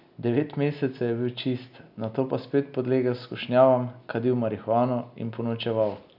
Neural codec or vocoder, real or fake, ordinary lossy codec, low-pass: none; real; none; 5.4 kHz